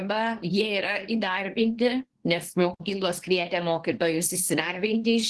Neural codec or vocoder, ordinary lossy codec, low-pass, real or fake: codec, 24 kHz, 0.9 kbps, WavTokenizer, small release; Opus, 16 kbps; 10.8 kHz; fake